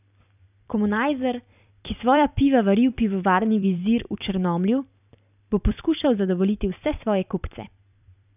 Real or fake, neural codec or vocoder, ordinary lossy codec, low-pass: fake; vocoder, 44.1 kHz, 128 mel bands every 256 samples, BigVGAN v2; none; 3.6 kHz